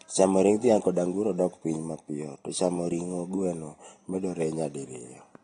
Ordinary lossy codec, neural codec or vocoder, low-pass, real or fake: AAC, 32 kbps; none; 9.9 kHz; real